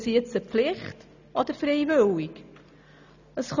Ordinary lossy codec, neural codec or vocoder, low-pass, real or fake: none; none; 7.2 kHz; real